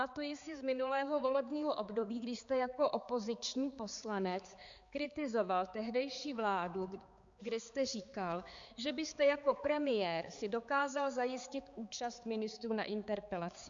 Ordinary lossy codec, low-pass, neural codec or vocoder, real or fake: Opus, 64 kbps; 7.2 kHz; codec, 16 kHz, 4 kbps, X-Codec, HuBERT features, trained on balanced general audio; fake